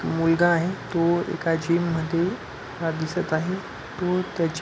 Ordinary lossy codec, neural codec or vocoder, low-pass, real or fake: none; none; none; real